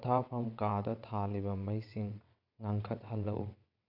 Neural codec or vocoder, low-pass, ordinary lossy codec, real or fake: vocoder, 22.05 kHz, 80 mel bands, WaveNeXt; 5.4 kHz; none; fake